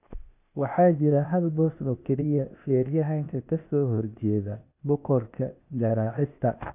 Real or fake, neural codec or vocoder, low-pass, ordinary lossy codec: fake; codec, 16 kHz, 0.8 kbps, ZipCodec; 3.6 kHz; none